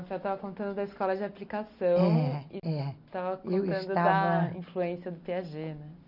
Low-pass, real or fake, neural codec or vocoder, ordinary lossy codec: 5.4 kHz; real; none; none